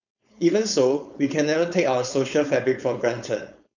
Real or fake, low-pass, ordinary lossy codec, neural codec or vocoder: fake; 7.2 kHz; none; codec, 16 kHz, 4.8 kbps, FACodec